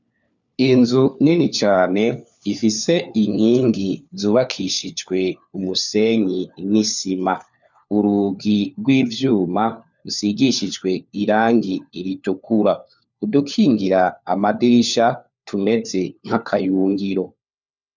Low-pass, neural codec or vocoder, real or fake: 7.2 kHz; codec, 16 kHz, 4 kbps, FunCodec, trained on LibriTTS, 50 frames a second; fake